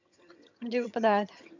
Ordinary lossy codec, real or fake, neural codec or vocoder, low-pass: none; fake; vocoder, 22.05 kHz, 80 mel bands, HiFi-GAN; 7.2 kHz